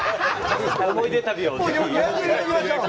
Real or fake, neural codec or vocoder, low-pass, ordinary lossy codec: real; none; none; none